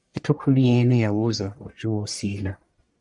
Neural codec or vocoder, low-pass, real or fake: codec, 44.1 kHz, 1.7 kbps, Pupu-Codec; 10.8 kHz; fake